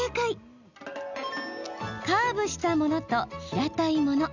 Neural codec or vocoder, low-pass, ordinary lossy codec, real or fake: none; 7.2 kHz; none; real